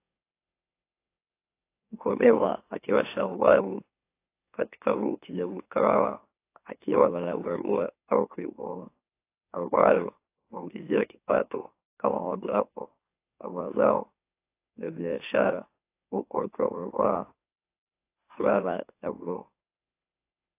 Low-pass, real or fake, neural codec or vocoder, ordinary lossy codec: 3.6 kHz; fake; autoencoder, 44.1 kHz, a latent of 192 numbers a frame, MeloTTS; AAC, 24 kbps